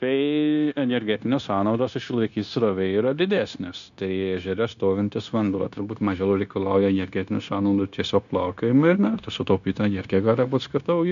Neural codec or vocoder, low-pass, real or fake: codec, 16 kHz, 0.9 kbps, LongCat-Audio-Codec; 7.2 kHz; fake